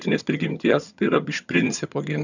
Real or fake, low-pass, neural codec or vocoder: fake; 7.2 kHz; vocoder, 22.05 kHz, 80 mel bands, HiFi-GAN